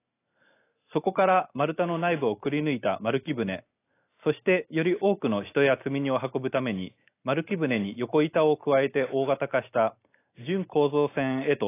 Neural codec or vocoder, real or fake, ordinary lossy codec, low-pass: none; real; AAC, 24 kbps; 3.6 kHz